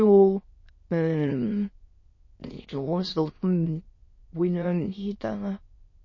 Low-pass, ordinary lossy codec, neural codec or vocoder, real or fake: 7.2 kHz; MP3, 32 kbps; autoencoder, 22.05 kHz, a latent of 192 numbers a frame, VITS, trained on many speakers; fake